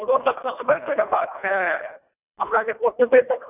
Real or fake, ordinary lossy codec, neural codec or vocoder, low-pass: fake; none; codec, 24 kHz, 1.5 kbps, HILCodec; 3.6 kHz